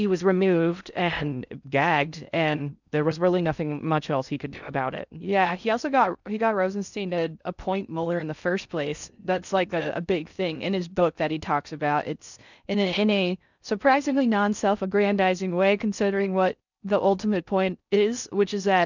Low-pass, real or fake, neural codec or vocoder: 7.2 kHz; fake; codec, 16 kHz in and 24 kHz out, 0.6 kbps, FocalCodec, streaming, 4096 codes